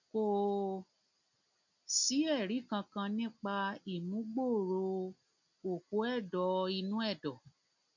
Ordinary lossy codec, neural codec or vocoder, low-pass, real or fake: none; none; 7.2 kHz; real